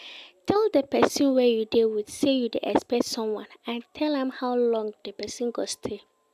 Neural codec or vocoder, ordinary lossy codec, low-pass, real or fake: none; AAC, 96 kbps; 14.4 kHz; real